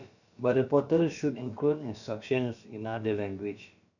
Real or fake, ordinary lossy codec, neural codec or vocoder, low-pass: fake; none; codec, 16 kHz, about 1 kbps, DyCAST, with the encoder's durations; 7.2 kHz